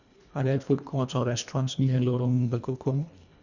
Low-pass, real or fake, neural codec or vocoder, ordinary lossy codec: 7.2 kHz; fake; codec, 24 kHz, 1.5 kbps, HILCodec; none